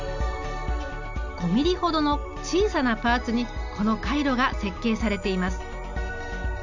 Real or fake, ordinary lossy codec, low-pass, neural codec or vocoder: real; none; 7.2 kHz; none